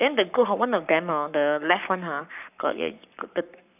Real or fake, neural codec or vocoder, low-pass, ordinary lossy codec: fake; codec, 44.1 kHz, 7.8 kbps, Pupu-Codec; 3.6 kHz; none